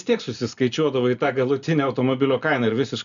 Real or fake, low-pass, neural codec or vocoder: real; 7.2 kHz; none